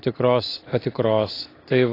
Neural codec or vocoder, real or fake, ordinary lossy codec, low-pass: none; real; AAC, 24 kbps; 5.4 kHz